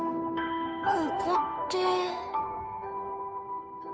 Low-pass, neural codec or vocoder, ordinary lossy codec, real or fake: none; codec, 16 kHz, 2 kbps, FunCodec, trained on Chinese and English, 25 frames a second; none; fake